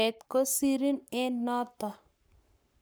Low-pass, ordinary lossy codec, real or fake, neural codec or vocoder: none; none; fake; codec, 44.1 kHz, 7.8 kbps, Pupu-Codec